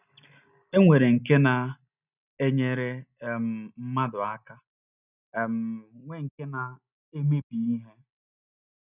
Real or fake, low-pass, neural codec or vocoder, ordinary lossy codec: real; 3.6 kHz; none; none